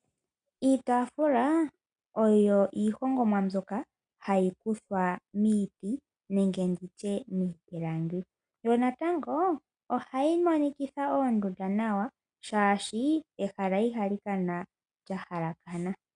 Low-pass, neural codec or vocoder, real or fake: 9.9 kHz; none; real